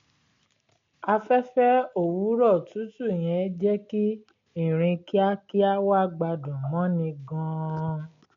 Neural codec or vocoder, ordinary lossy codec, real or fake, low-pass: none; MP3, 48 kbps; real; 7.2 kHz